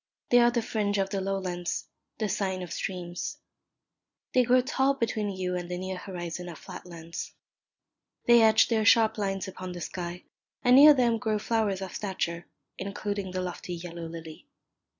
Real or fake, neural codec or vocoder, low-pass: real; none; 7.2 kHz